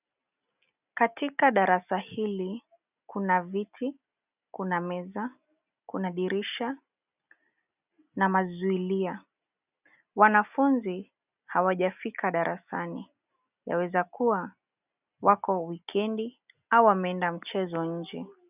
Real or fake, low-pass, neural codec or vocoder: real; 3.6 kHz; none